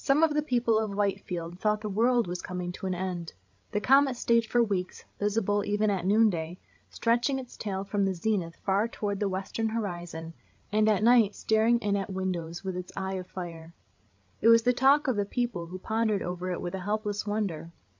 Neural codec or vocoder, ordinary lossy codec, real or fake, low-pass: codec, 16 kHz, 16 kbps, FreqCodec, larger model; MP3, 64 kbps; fake; 7.2 kHz